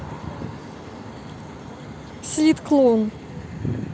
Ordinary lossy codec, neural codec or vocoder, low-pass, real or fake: none; none; none; real